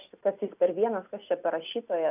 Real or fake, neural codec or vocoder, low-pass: real; none; 3.6 kHz